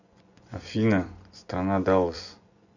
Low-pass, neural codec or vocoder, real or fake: 7.2 kHz; vocoder, 24 kHz, 100 mel bands, Vocos; fake